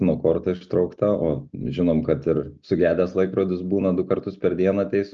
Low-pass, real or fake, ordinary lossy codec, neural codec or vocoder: 7.2 kHz; real; Opus, 24 kbps; none